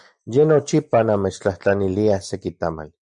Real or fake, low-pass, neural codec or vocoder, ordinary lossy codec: real; 9.9 kHz; none; MP3, 96 kbps